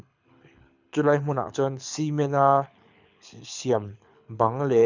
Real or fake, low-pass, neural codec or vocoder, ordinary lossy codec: fake; 7.2 kHz; codec, 24 kHz, 6 kbps, HILCodec; none